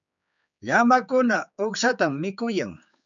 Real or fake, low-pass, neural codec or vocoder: fake; 7.2 kHz; codec, 16 kHz, 4 kbps, X-Codec, HuBERT features, trained on general audio